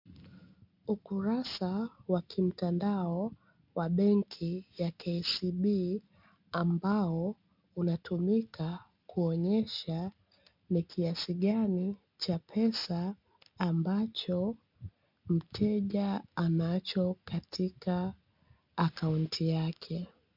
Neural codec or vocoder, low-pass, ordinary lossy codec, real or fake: none; 5.4 kHz; MP3, 48 kbps; real